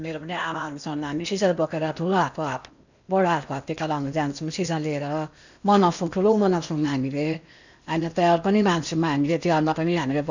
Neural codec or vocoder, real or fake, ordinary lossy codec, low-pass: codec, 16 kHz in and 24 kHz out, 0.8 kbps, FocalCodec, streaming, 65536 codes; fake; none; 7.2 kHz